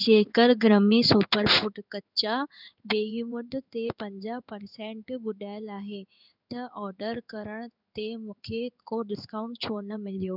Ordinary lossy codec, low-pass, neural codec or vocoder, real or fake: none; 5.4 kHz; codec, 16 kHz in and 24 kHz out, 1 kbps, XY-Tokenizer; fake